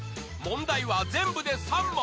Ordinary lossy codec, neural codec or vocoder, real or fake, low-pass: none; none; real; none